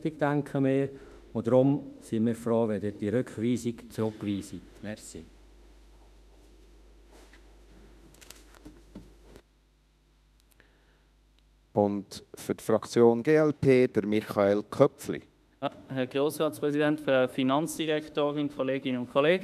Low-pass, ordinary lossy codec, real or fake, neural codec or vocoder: 14.4 kHz; none; fake; autoencoder, 48 kHz, 32 numbers a frame, DAC-VAE, trained on Japanese speech